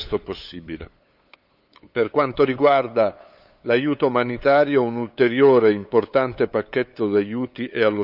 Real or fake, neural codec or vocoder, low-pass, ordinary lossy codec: fake; codec, 16 kHz, 8 kbps, FunCodec, trained on LibriTTS, 25 frames a second; 5.4 kHz; none